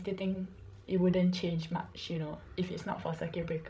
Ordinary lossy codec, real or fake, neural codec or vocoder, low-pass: none; fake; codec, 16 kHz, 16 kbps, FreqCodec, larger model; none